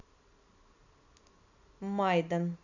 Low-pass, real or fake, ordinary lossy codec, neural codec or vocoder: 7.2 kHz; real; none; none